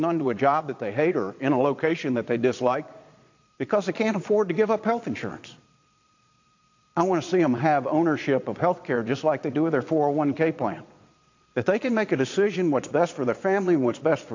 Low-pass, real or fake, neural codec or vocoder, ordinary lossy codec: 7.2 kHz; fake; vocoder, 22.05 kHz, 80 mel bands, Vocos; AAC, 48 kbps